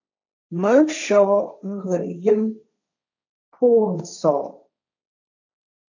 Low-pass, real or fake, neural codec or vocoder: 7.2 kHz; fake; codec, 16 kHz, 1.1 kbps, Voila-Tokenizer